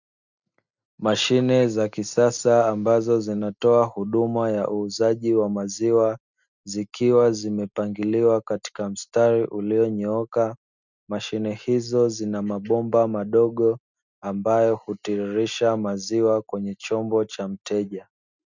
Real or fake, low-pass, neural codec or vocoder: real; 7.2 kHz; none